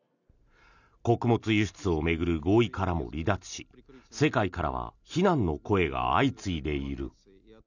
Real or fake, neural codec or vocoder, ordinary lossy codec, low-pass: real; none; none; 7.2 kHz